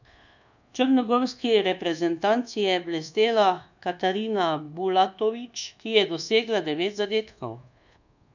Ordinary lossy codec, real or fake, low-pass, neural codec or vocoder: none; fake; 7.2 kHz; codec, 24 kHz, 1.2 kbps, DualCodec